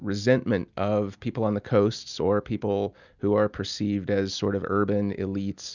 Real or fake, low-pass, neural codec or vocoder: real; 7.2 kHz; none